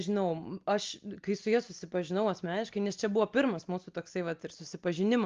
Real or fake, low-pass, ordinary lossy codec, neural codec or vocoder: real; 7.2 kHz; Opus, 32 kbps; none